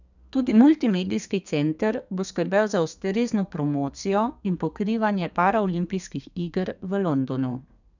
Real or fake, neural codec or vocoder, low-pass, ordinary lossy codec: fake; codec, 32 kHz, 1.9 kbps, SNAC; 7.2 kHz; none